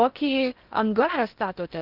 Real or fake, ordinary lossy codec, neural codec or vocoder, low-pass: fake; Opus, 32 kbps; codec, 16 kHz in and 24 kHz out, 0.6 kbps, FocalCodec, streaming, 4096 codes; 5.4 kHz